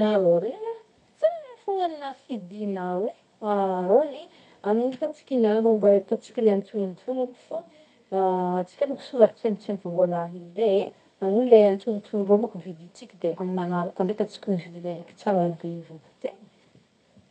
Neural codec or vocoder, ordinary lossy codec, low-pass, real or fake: codec, 24 kHz, 0.9 kbps, WavTokenizer, medium music audio release; none; 10.8 kHz; fake